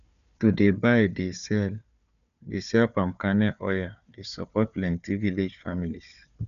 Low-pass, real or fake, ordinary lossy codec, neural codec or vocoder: 7.2 kHz; fake; none; codec, 16 kHz, 4 kbps, FunCodec, trained on Chinese and English, 50 frames a second